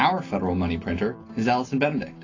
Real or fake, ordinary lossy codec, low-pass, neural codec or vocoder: real; AAC, 32 kbps; 7.2 kHz; none